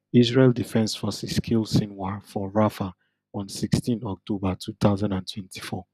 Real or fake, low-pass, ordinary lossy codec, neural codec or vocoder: real; 14.4 kHz; none; none